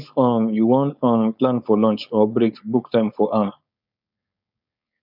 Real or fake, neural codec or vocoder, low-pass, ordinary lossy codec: fake; codec, 16 kHz, 4.8 kbps, FACodec; 5.4 kHz; none